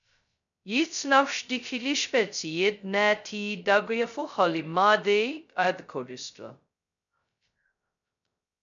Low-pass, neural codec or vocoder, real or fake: 7.2 kHz; codec, 16 kHz, 0.2 kbps, FocalCodec; fake